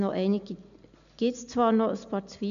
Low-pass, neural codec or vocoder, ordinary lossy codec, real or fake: 7.2 kHz; none; none; real